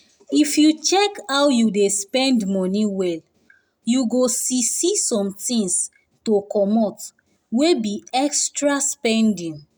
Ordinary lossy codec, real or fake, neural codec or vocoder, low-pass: none; real; none; none